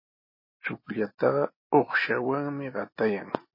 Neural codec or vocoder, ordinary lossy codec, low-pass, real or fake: none; MP3, 24 kbps; 5.4 kHz; real